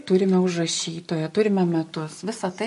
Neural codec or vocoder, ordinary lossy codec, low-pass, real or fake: none; MP3, 48 kbps; 14.4 kHz; real